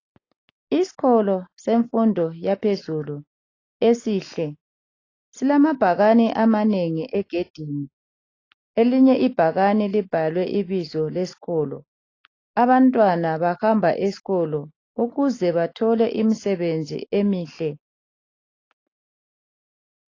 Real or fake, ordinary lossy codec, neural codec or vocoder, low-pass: real; AAC, 32 kbps; none; 7.2 kHz